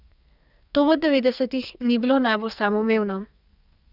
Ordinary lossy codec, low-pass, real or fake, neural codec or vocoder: none; 5.4 kHz; fake; codec, 44.1 kHz, 2.6 kbps, SNAC